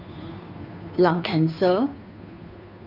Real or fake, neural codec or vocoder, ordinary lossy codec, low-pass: fake; codec, 16 kHz, 2 kbps, FunCodec, trained on Chinese and English, 25 frames a second; none; 5.4 kHz